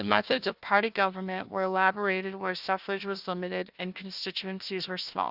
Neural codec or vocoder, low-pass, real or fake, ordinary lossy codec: codec, 16 kHz, 1 kbps, FunCodec, trained on Chinese and English, 50 frames a second; 5.4 kHz; fake; Opus, 64 kbps